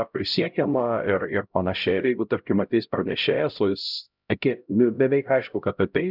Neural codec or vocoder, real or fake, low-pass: codec, 16 kHz, 0.5 kbps, X-Codec, HuBERT features, trained on LibriSpeech; fake; 5.4 kHz